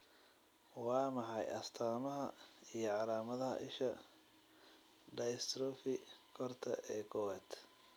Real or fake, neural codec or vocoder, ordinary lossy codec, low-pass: real; none; none; none